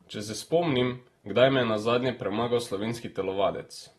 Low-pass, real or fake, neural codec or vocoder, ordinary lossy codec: 14.4 kHz; real; none; AAC, 32 kbps